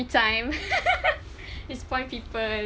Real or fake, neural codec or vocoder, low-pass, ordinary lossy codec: real; none; none; none